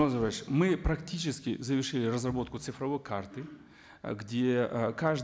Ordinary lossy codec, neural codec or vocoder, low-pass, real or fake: none; none; none; real